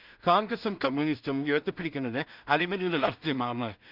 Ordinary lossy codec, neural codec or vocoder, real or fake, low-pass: Opus, 64 kbps; codec, 16 kHz in and 24 kHz out, 0.4 kbps, LongCat-Audio-Codec, two codebook decoder; fake; 5.4 kHz